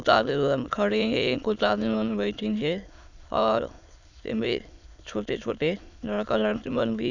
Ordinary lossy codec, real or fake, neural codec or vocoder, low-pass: none; fake; autoencoder, 22.05 kHz, a latent of 192 numbers a frame, VITS, trained on many speakers; 7.2 kHz